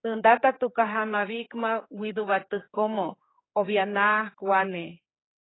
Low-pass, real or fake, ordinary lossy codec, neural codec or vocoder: 7.2 kHz; fake; AAC, 16 kbps; codec, 16 kHz, 4 kbps, X-Codec, HuBERT features, trained on general audio